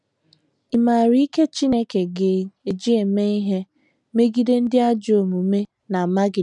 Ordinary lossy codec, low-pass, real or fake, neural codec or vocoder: none; 10.8 kHz; real; none